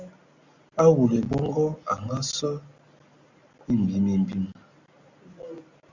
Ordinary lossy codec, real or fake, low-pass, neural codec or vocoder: Opus, 64 kbps; real; 7.2 kHz; none